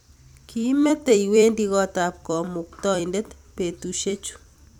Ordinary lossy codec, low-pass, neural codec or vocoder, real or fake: none; 19.8 kHz; vocoder, 44.1 kHz, 128 mel bands every 256 samples, BigVGAN v2; fake